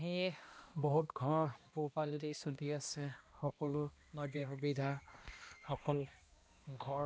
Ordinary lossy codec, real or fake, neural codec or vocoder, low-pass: none; fake; codec, 16 kHz, 1 kbps, X-Codec, HuBERT features, trained on balanced general audio; none